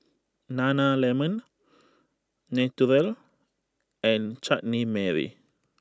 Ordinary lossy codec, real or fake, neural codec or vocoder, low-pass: none; real; none; none